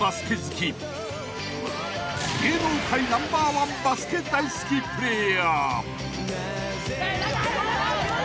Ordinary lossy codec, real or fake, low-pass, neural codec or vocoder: none; real; none; none